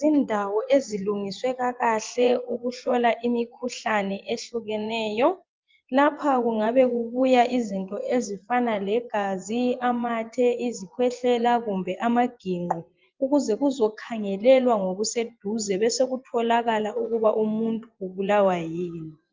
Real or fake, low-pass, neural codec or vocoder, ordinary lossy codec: fake; 7.2 kHz; vocoder, 24 kHz, 100 mel bands, Vocos; Opus, 24 kbps